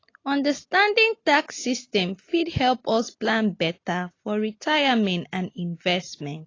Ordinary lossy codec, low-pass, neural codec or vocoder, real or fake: AAC, 32 kbps; 7.2 kHz; none; real